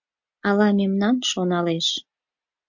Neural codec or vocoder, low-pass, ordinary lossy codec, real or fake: none; 7.2 kHz; MP3, 48 kbps; real